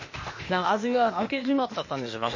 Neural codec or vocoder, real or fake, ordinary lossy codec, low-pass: codec, 16 kHz, 0.8 kbps, ZipCodec; fake; MP3, 32 kbps; 7.2 kHz